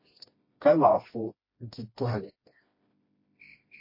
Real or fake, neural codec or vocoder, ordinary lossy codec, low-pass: fake; codec, 16 kHz, 1 kbps, FreqCodec, smaller model; MP3, 24 kbps; 5.4 kHz